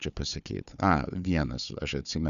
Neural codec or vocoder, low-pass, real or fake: codec, 16 kHz, 4 kbps, FunCodec, trained on Chinese and English, 50 frames a second; 7.2 kHz; fake